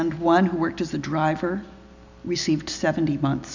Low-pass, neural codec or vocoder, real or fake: 7.2 kHz; none; real